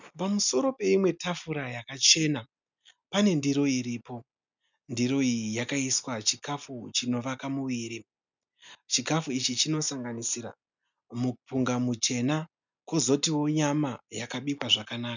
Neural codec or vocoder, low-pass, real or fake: none; 7.2 kHz; real